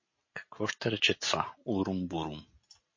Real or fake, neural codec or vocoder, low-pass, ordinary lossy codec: real; none; 7.2 kHz; MP3, 32 kbps